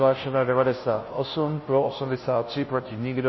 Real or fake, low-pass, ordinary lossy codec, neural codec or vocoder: fake; 7.2 kHz; MP3, 24 kbps; codec, 16 kHz, 0.5 kbps, FunCodec, trained on Chinese and English, 25 frames a second